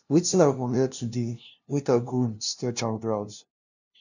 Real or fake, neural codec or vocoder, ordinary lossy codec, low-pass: fake; codec, 16 kHz, 0.5 kbps, FunCodec, trained on LibriTTS, 25 frames a second; none; 7.2 kHz